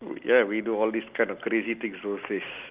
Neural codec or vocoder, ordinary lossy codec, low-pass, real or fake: none; Opus, 32 kbps; 3.6 kHz; real